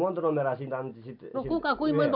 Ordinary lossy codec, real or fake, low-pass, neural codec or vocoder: none; real; 5.4 kHz; none